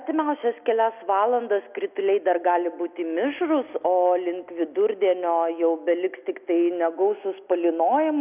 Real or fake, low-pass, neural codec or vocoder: real; 3.6 kHz; none